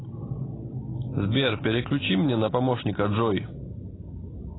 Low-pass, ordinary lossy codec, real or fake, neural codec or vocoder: 7.2 kHz; AAC, 16 kbps; real; none